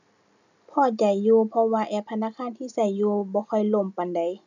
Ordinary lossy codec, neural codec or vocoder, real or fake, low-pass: none; none; real; 7.2 kHz